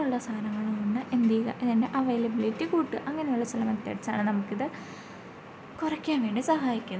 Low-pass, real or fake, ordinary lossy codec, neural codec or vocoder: none; real; none; none